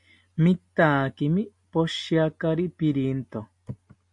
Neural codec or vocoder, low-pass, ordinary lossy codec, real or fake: none; 10.8 kHz; MP3, 64 kbps; real